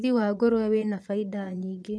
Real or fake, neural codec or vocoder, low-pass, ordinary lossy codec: fake; vocoder, 22.05 kHz, 80 mel bands, Vocos; none; none